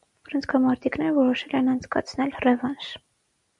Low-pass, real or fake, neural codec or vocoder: 10.8 kHz; real; none